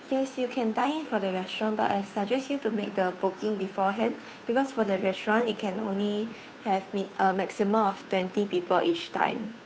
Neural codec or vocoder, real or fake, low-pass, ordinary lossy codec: codec, 16 kHz, 2 kbps, FunCodec, trained on Chinese and English, 25 frames a second; fake; none; none